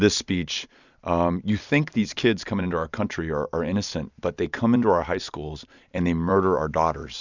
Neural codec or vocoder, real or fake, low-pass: vocoder, 44.1 kHz, 128 mel bands every 256 samples, BigVGAN v2; fake; 7.2 kHz